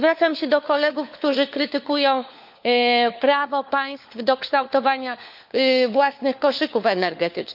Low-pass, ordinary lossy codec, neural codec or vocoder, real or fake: 5.4 kHz; none; codec, 16 kHz, 4 kbps, FunCodec, trained on LibriTTS, 50 frames a second; fake